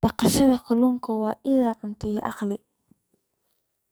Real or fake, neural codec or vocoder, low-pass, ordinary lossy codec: fake; codec, 44.1 kHz, 2.6 kbps, SNAC; none; none